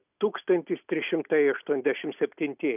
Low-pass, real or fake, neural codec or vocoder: 3.6 kHz; real; none